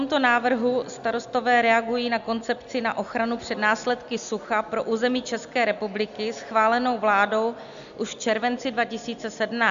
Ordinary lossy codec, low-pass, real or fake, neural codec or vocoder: AAC, 96 kbps; 7.2 kHz; real; none